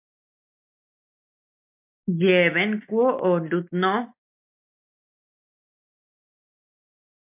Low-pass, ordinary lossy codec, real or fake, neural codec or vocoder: 3.6 kHz; MP3, 32 kbps; real; none